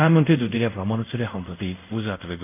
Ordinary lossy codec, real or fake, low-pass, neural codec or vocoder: none; fake; 3.6 kHz; codec, 24 kHz, 0.5 kbps, DualCodec